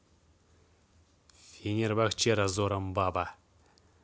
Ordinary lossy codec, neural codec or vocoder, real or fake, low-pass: none; none; real; none